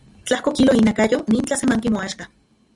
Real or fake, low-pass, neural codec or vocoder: real; 10.8 kHz; none